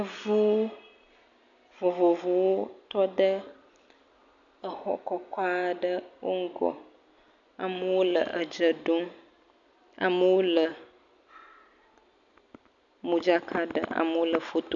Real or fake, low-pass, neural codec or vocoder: real; 7.2 kHz; none